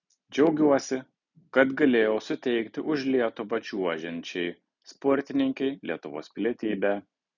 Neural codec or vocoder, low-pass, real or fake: none; 7.2 kHz; real